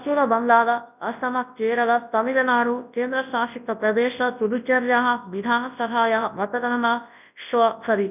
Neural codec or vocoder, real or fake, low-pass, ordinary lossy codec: codec, 24 kHz, 0.9 kbps, WavTokenizer, large speech release; fake; 3.6 kHz; none